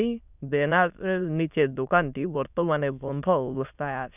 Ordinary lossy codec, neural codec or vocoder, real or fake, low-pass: none; autoencoder, 22.05 kHz, a latent of 192 numbers a frame, VITS, trained on many speakers; fake; 3.6 kHz